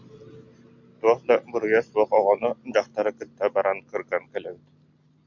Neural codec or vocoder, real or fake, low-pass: none; real; 7.2 kHz